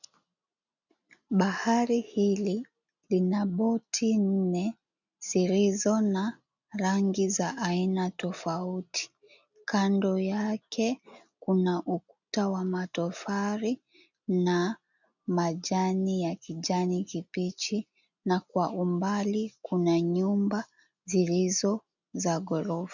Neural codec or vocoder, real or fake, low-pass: none; real; 7.2 kHz